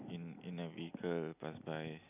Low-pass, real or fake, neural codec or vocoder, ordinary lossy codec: 3.6 kHz; real; none; none